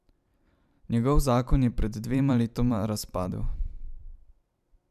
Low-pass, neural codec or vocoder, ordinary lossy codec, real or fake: 14.4 kHz; vocoder, 44.1 kHz, 128 mel bands every 512 samples, BigVGAN v2; none; fake